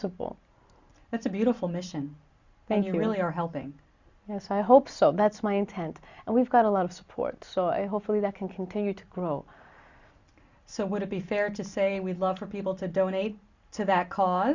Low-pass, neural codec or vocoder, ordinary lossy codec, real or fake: 7.2 kHz; none; Opus, 64 kbps; real